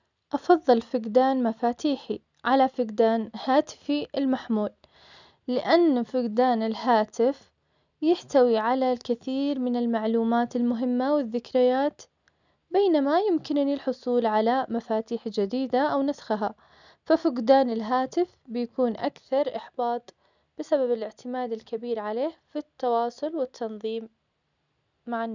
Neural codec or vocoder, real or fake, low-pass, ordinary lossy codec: none; real; 7.2 kHz; none